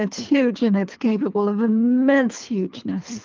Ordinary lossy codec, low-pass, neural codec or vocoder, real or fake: Opus, 24 kbps; 7.2 kHz; codec, 24 kHz, 3 kbps, HILCodec; fake